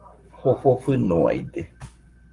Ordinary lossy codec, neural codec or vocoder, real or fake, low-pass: Opus, 32 kbps; codec, 44.1 kHz, 7.8 kbps, Pupu-Codec; fake; 10.8 kHz